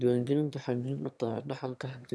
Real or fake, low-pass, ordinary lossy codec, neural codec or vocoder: fake; none; none; autoencoder, 22.05 kHz, a latent of 192 numbers a frame, VITS, trained on one speaker